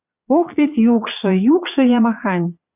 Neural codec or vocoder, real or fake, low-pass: vocoder, 22.05 kHz, 80 mel bands, Vocos; fake; 3.6 kHz